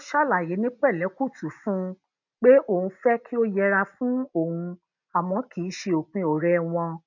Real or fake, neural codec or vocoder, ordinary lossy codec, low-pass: real; none; none; 7.2 kHz